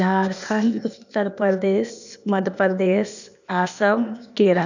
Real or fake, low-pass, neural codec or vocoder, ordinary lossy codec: fake; 7.2 kHz; codec, 16 kHz, 0.8 kbps, ZipCodec; none